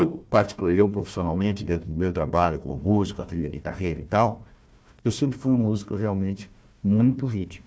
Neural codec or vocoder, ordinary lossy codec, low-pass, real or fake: codec, 16 kHz, 1 kbps, FunCodec, trained on Chinese and English, 50 frames a second; none; none; fake